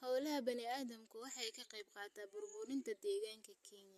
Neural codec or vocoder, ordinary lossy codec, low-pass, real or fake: none; MP3, 64 kbps; 14.4 kHz; real